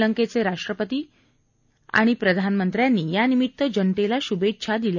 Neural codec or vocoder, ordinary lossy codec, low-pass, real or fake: none; none; 7.2 kHz; real